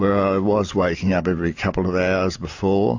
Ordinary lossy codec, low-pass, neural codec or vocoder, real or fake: AAC, 48 kbps; 7.2 kHz; none; real